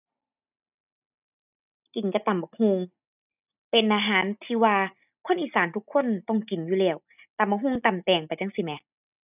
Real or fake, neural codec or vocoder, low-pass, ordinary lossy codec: real; none; 3.6 kHz; none